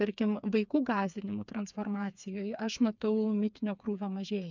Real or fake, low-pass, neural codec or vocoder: fake; 7.2 kHz; codec, 16 kHz, 4 kbps, FreqCodec, smaller model